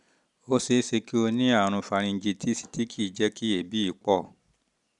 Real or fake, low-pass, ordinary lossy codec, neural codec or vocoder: real; 10.8 kHz; none; none